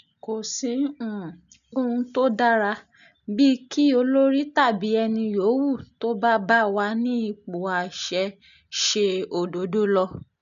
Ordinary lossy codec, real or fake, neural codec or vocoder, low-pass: none; real; none; 7.2 kHz